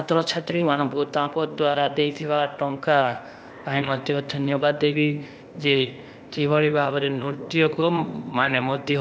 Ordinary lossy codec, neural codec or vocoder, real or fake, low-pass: none; codec, 16 kHz, 0.8 kbps, ZipCodec; fake; none